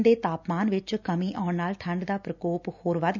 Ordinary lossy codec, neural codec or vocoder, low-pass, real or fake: none; none; 7.2 kHz; real